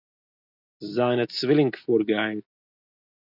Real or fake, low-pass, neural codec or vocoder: real; 5.4 kHz; none